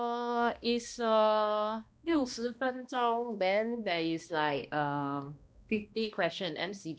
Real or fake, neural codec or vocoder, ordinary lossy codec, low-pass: fake; codec, 16 kHz, 1 kbps, X-Codec, HuBERT features, trained on balanced general audio; none; none